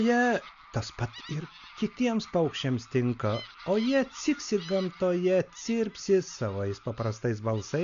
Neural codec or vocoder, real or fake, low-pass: none; real; 7.2 kHz